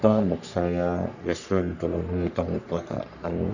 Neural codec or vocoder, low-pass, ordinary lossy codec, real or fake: codec, 44.1 kHz, 3.4 kbps, Pupu-Codec; 7.2 kHz; none; fake